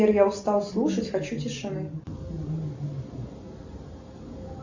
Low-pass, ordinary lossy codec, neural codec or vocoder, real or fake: 7.2 kHz; Opus, 64 kbps; none; real